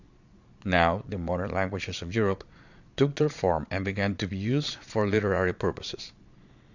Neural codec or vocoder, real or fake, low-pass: vocoder, 22.05 kHz, 80 mel bands, Vocos; fake; 7.2 kHz